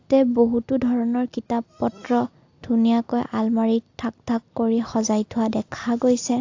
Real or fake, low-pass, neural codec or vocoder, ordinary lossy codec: real; 7.2 kHz; none; AAC, 48 kbps